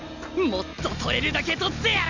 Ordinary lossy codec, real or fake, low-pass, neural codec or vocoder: none; real; 7.2 kHz; none